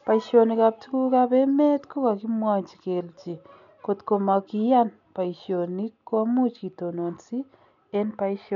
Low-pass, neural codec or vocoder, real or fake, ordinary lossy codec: 7.2 kHz; none; real; none